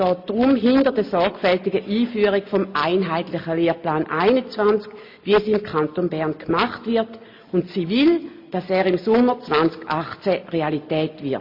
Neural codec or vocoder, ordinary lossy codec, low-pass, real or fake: none; MP3, 32 kbps; 5.4 kHz; real